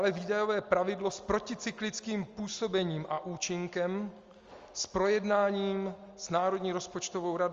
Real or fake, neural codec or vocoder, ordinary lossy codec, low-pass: real; none; Opus, 32 kbps; 7.2 kHz